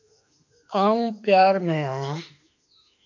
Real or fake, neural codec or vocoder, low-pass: fake; autoencoder, 48 kHz, 32 numbers a frame, DAC-VAE, trained on Japanese speech; 7.2 kHz